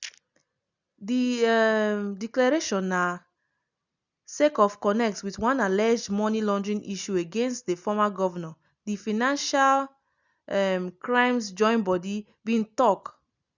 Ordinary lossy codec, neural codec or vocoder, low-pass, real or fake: none; none; 7.2 kHz; real